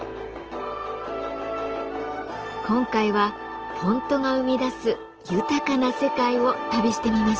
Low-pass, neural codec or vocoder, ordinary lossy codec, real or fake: 7.2 kHz; none; Opus, 16 kbps; real